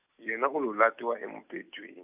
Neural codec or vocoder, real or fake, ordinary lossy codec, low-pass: none; real; none; 3.6 kHz